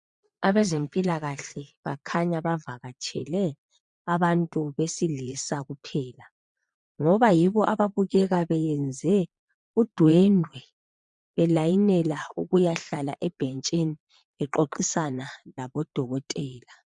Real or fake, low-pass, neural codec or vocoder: fake; 9.9 kHz; vocoder, 22.05 kHz, 80 mel bands, Vocos